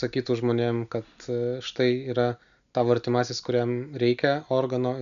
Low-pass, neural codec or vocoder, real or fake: 7.2 kHz; none; real